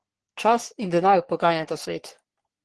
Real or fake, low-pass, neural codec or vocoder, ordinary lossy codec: fake; 10.8 kHz; codec, 44.1 kHz, 3.4 kbps, Pupu-Codec; Opus, 16 kbps